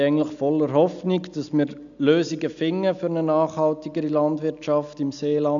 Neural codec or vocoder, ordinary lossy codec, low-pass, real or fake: none; none; 7.2 kHz; real